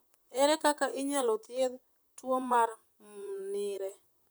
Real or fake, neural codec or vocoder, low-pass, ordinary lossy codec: fake; vocoder, 44.1 kHz, 128 mel bands, Pupu-Vocoder; none; none